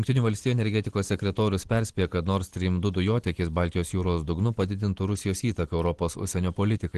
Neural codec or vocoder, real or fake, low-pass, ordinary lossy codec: none; real; 10.8 kHz; Opus, 16 kbps